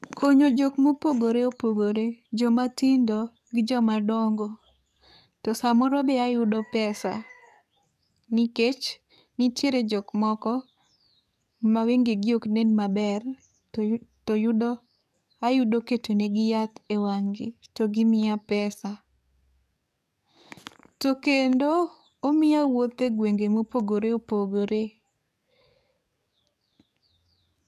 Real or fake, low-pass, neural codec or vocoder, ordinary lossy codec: fake; 14.4 kHz; codec, 44.1 kHz, 7.8 kbps, DAC; none